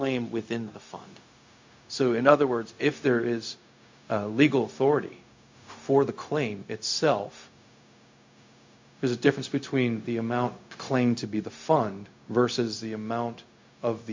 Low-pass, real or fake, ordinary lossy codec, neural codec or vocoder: 7.2 kHz; fake; MP3, 48 kbps; codec, 16 kHz, 0.4 kbps, LongCat-Audio-Codec